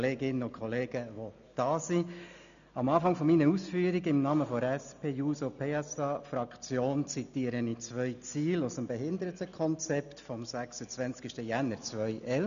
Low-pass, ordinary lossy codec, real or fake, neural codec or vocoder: 7.2 kHz; none; real; none